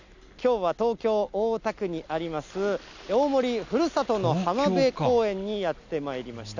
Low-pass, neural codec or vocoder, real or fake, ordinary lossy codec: 7.2 kHz; none; real; none